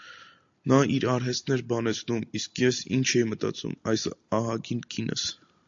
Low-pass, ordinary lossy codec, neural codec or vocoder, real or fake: 7.2 kHz; AAC, 64 kbps; none; real